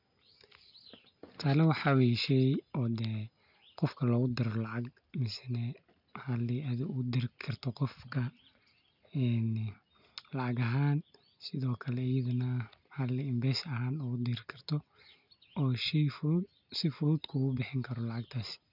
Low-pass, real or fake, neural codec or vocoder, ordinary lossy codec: 5.4 kHz; real; none; none